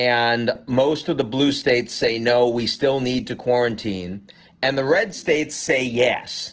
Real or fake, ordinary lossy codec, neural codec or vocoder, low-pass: real; Opus, 16 kbps; none; 7.2 kHz